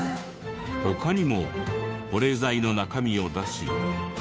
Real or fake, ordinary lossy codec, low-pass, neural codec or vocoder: fake; none; none; codec, 16 kHz, 2 kbps, FunCodec, trained on Chinese and English, 25 frames a second